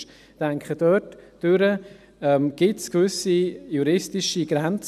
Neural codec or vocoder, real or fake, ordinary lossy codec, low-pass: none; real; none; 14.4 kHz